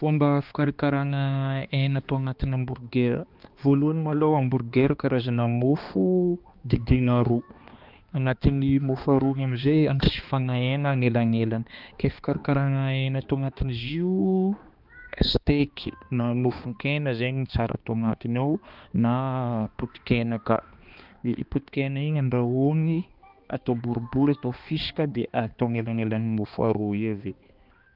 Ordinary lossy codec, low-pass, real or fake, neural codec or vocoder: Opus, 24 kbps; 5.4 kHz; fake; codec, 16 kHz, 2 kbps, X-Codec, HuBERT features, trained on balanced general audio